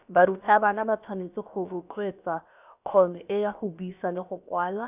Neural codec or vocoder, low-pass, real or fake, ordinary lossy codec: codec, 16 kHz, about 1 kbps, DyCAST, with the encoder's durations; 3.6 kHz; fake; none